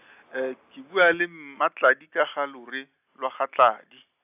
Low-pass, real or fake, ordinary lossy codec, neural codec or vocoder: 3.6 kHz; real; AAC, 32 kbps; none